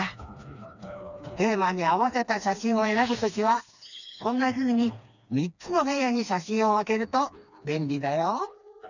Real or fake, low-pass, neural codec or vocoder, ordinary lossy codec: fake; 7.2 kHz; codec, 16 kHz, 2 kbps, FreqCodec, smaller model; none